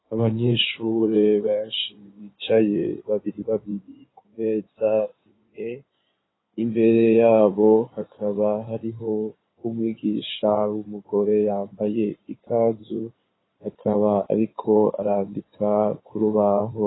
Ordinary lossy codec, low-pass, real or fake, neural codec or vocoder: AAC, 16 kbps; 7.2 kHz; fake; codec, 16 kHz in and 24 kHz out, 2.2 kbps, FireRedTTS-2 codec